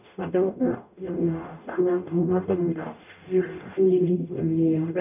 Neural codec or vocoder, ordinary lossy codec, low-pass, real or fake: codec, 44.1 kHz, 0.9 kbps, DAC; none; 3.6 kHz; fake